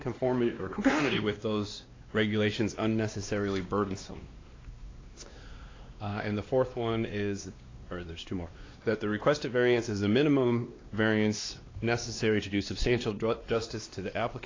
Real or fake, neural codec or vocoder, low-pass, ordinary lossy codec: fake; codec, 16 kHz, 2 kbps, X-Codec, WavLM features, trained on Multilingual LibriSpeech; 7.2 kHz; AAC, 32 kbps